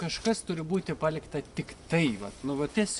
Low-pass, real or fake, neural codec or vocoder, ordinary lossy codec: 10.8 kHz; fake; vocoder, 24 kHz, 100 mel bands, Vocos; Opus, 64 kbps